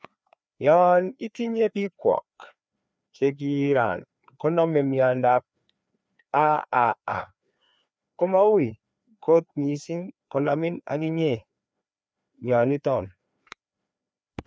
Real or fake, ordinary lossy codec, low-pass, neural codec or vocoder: fake; none; none; codec, 16 kHz, 2 kbps, FreqCodec, larger model